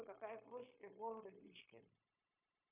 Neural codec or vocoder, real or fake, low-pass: codec, 16 kHz, 0.9 kbps, LongCat-Audio-Codec; fake; 3.6 kHz